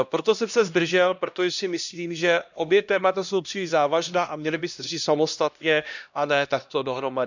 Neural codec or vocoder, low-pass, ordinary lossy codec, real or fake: codec, 16 kHz, 1 kbps, X-Codec, HuBERT features, trained on LibriSpeech; 7.2 kHz; none; fake